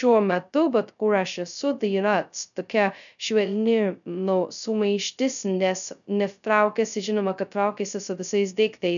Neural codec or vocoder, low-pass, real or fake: codec, 16 kHz, 0.2 kbps, FocalCodec; 7.2 kHz; fake